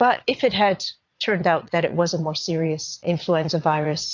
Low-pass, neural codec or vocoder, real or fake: 7.2 kHz; vocoder, 22.05 kHz, 80 mel bands, Vocos; fake